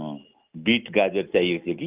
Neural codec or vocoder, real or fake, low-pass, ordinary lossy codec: none; real; 3.6 kHz; Opus, 32 kbps